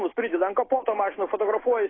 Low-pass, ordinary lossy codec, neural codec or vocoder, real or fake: 7.2 kHz; AAC, 16 kbps; none; real